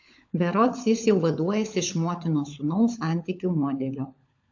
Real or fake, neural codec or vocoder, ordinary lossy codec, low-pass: fake; codec, 16 kHz, 16 kbps, FunCodec, trained on LibriTTS, 50 frames a second; AAC, 48 kbps; 7.2 kHz